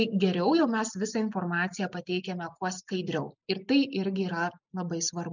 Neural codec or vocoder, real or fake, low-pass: none; real; 7.2 kHz